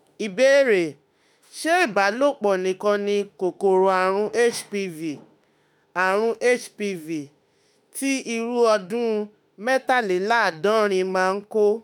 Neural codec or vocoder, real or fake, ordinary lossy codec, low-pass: autoencoder, 48 kHz, 32 numbers a frame, DAC-VAE, trained on Japanese speech; fake; none; none